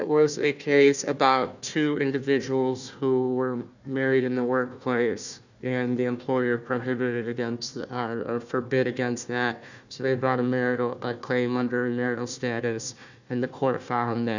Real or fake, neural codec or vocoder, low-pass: fake; codec, 16 kHz, 1 kbps, FunCodec, trained on Chinese and English, 50 frames a second; 7.2 kHz